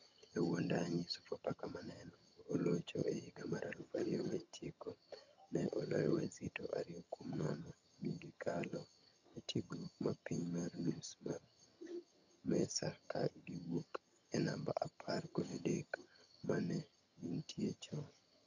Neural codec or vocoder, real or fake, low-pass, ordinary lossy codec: vocoder, 22.05 kHz, 80 mel bands, HiFi-GAN; fake; 7.2 kHz; none